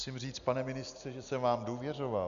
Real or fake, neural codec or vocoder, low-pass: real; none; 7.2 kHz